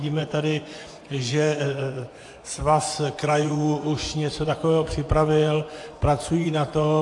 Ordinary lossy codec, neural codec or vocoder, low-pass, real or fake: AAC, 48 kbps; vocoder, 24 kHz, 100 mel bands, Vocos; 10.8 kHz; fake